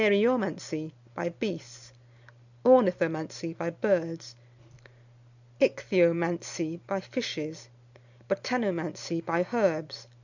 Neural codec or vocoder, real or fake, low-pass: none; real; 7.2 kHz